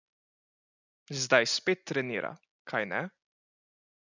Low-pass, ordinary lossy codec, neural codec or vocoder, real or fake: 7.2 kHz; none; none; real